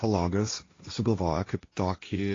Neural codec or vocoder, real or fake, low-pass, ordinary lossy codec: codec, 16 kHz, 1.1 kbps, Voila-Tokenizer; fake; 7.2 kHz; AAC, 32 kbps